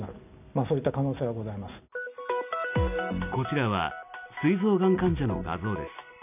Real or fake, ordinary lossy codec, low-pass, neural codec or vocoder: real; none; 3.6 kHz; none